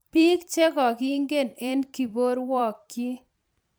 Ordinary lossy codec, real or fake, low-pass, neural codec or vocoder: none; fake; none; vocoder, 44.1 kHz, 128 mel bands every 512 samples, BigVGAN v2